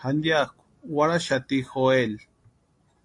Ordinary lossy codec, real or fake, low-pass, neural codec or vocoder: AAC, 48 kbps; real; 10.8 kHz; none